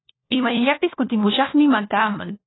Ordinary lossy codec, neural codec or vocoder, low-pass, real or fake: AAC, 16 kbps; codec, 16 kHz, 1 kbps, FunCodec, trained on LibriTTS, 50 frames a second; 7.2 kHz; fake